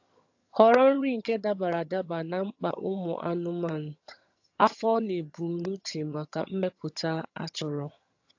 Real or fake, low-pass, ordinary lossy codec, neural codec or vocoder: fake; 7.2 kHz; AAC, 48 kbps; vocoder, 22.05 kHz, 80 mel bands, HiFi-GAN